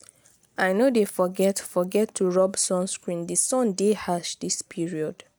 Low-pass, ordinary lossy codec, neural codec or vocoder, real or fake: none; none; none; real